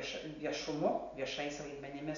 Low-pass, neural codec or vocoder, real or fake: 7.2 kHz; none; real